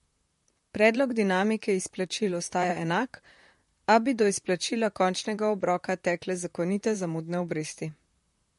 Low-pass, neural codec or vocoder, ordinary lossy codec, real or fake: 14.4 kHz; vocoder, 44.1 kHz, 128 mel bands, Pupu-Vocoder; MP3, 48 kbps; fake